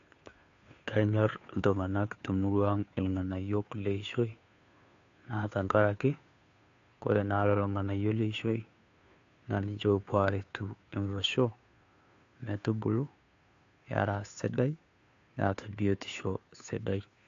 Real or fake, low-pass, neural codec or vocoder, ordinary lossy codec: fake; 7.2 kHz; codec, 16 kHz, 2 kbps, FunCodec, trained on Chinese and English, 25 frames a second; AAC, 48 kbps